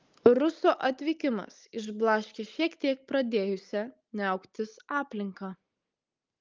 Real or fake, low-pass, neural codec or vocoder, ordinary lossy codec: real; 7.2 kHz; none; Opus, 24 kbps